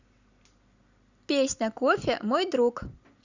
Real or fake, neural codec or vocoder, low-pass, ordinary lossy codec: real; none; 7.2 kHz; Opus, 64 kbps